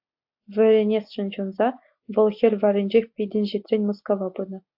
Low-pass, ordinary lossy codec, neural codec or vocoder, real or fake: 5.4 kHz; AAC, 48 kbps; none; real